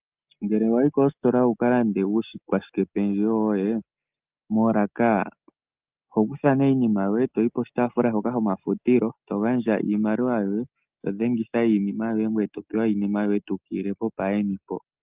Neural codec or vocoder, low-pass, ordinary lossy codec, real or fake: none; 3.6 kHz; Opus, 24 kbps; real